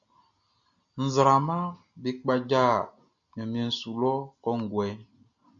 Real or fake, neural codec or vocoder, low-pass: real; none; 7.2 kHz